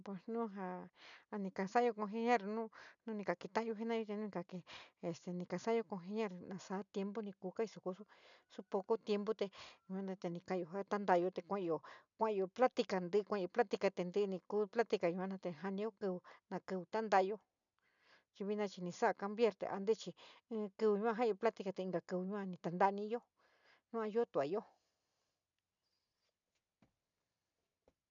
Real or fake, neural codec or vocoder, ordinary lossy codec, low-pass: real; none; none; 7.2 kHz